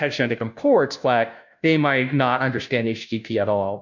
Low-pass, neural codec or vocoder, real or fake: 7.2 kHz; codec, 16 kHz, 0.5 kbps, FunCodec, trained on Chinese and English, 25 frames a second; fake